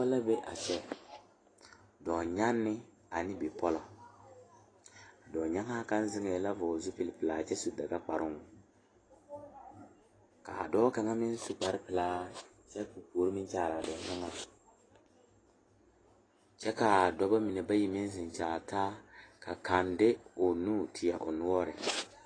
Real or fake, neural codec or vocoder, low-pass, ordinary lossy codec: real; none; 9.9 kHz; AAC, 32 kbps